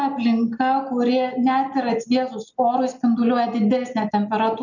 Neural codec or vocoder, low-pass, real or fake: none; 7.2 kHz; real